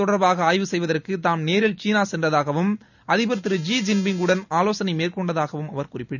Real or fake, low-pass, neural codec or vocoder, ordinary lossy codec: real; 7.2 kHz; none; none